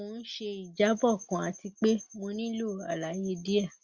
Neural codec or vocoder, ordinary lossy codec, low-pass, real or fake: none; Opus, 64 kbps; 7.2 kHz; real